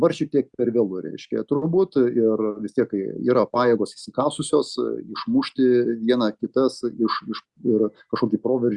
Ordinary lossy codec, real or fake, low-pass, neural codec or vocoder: Opus, 64 kbps; real; 10.8 kHz; none